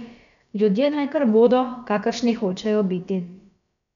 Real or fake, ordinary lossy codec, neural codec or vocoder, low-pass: fake; none; codec, 16 kHz, about 1 kbps, DyCAST, with the encoder's durations; 7.2 kHz